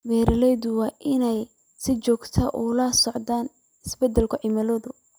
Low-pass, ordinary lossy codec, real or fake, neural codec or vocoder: none; none; real; none